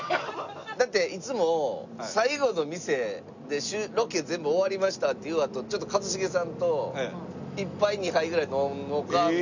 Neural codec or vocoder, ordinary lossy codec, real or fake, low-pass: none; none; real; 7.2 kHz